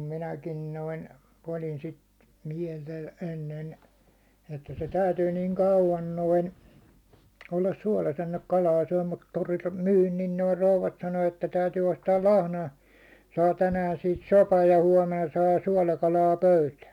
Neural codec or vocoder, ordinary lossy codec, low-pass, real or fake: none; none; 19.8 kHz; real